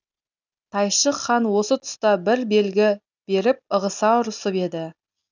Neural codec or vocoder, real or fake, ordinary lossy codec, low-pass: none; real; none; 7.2 kHz